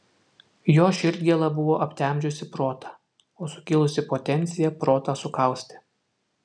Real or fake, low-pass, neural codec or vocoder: real; 9.9 kHz; none